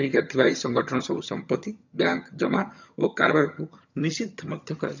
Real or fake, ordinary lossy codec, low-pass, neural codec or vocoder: fake; none; 7.2 kHz; vocoder, 22.05 kHz, 80 mel bands, HiFi-GAN